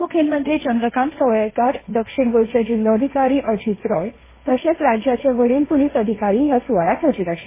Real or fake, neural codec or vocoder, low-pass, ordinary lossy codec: fake; codec, 16 kHz, 1.1 kbps, Voila-Tokenizer; 3.6 kHz; MP3, 16 kbps